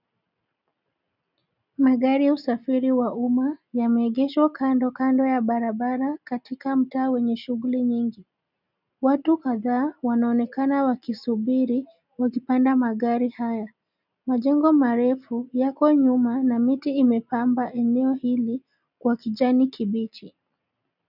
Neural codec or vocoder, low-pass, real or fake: none; 5.4 kHz; real